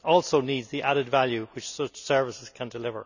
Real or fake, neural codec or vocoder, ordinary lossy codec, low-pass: real; none; none; 7.2 kHz